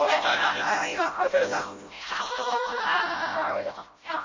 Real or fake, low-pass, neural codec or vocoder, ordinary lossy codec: fake; 7.2 kHz; codec, 16 kHz, 0.5 kbps, FreqCodec, smaller model; MP3, 32 kbps